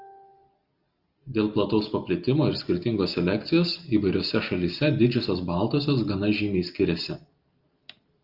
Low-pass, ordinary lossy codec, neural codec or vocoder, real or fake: 5.4 kHz; Opus, 24 kbps; none; real